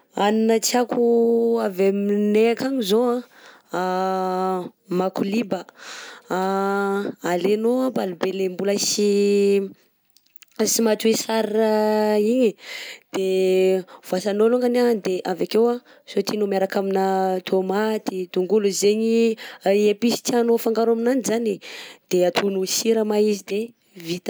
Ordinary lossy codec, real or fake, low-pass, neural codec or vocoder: none; real; none; none